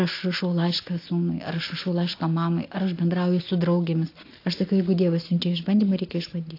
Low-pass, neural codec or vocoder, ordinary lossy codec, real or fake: 5.4 kHz; none; AAC, 32 kbps; real